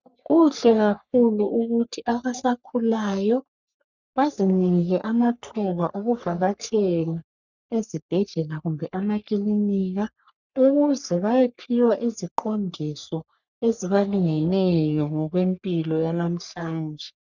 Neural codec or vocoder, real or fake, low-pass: codec, 44.1 kHz, 3.4 kbps, Pupu-Codec; fake; 7.2 kHz